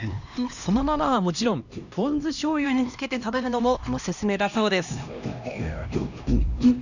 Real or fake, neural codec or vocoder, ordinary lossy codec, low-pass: fake; codec, 16 kHz, 1 kbps, X-Codec, HuBERT features, trained on LibriSpeech; none; 7.2 kHz